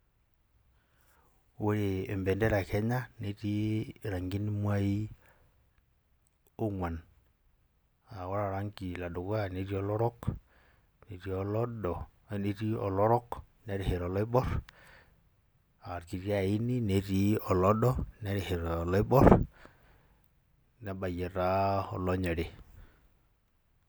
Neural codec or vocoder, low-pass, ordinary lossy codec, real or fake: none; none; none; real